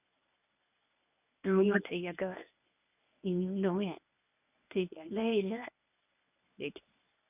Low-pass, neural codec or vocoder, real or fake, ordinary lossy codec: 3.6 kHz; codec, 24 kHz, 0.9 kbps, WavTokenizer, medium speech release version 1; fake; none